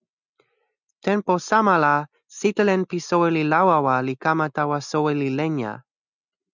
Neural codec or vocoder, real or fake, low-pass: none; real; 7.2 kHz